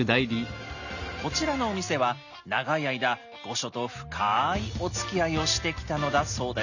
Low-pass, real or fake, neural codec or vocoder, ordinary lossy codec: 7.2 kHz; real; none; none